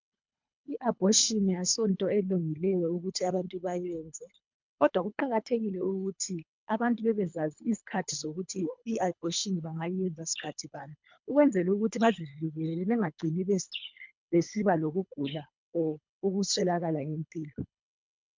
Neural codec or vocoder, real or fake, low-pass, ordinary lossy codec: codec, 24 kHz, 3 kbps, HILCodec; fake; 7.2 kHz; MP3, 64 kbps